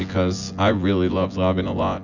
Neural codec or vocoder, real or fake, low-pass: vocoder, 24 kHz, 100 mel bands, Vocos; fake; 7.2 kHz